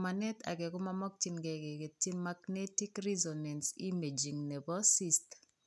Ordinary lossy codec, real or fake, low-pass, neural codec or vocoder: none; real; none; none